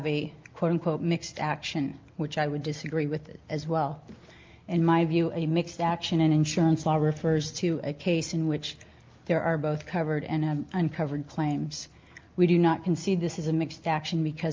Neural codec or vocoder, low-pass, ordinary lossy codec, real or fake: none; 7.2 kHz; Opus, 32 kbps; real